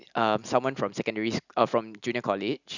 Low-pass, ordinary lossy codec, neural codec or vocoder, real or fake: 7.2 kHz; none; none; real